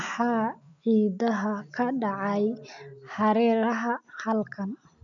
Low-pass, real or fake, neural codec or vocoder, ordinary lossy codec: 7.2 kHz; real; none; none